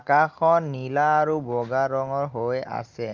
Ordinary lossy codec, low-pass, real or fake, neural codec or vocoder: Opus, 24 kbps; 7.2 kHz; real; none